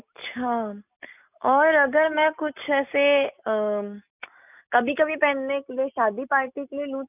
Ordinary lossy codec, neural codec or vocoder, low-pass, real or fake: none; none; 3.6 kHz; real